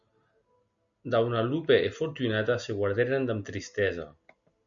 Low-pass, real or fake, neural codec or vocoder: 7.2 kHz; real; none